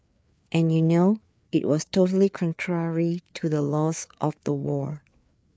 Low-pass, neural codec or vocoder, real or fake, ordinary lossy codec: none; codec, 16 kHz, 4 kbps, FreqCodec, larger model; fake; none